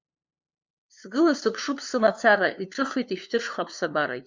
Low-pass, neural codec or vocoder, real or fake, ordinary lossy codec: 7.2 kHz; codec, 16 kHz, 2 kbps, FunCodec, trained on LibriTTS, 25 frames a second; fake; MP3, 64 kbps